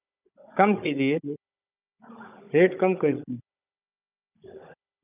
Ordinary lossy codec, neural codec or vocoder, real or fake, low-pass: none; codec, 16 kHz, 16 kbps, FunCodec, trained on Chinese and English, 50 frames a second; fake; 3.6 kHz